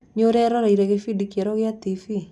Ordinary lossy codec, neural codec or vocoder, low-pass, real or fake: none; none; none; real